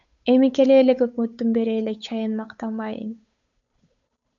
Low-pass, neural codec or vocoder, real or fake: 7.2 kHz; codec, 16 kHz, 8 kbps, FunCodec, trained on Chinese and English, 25 frames a second; fake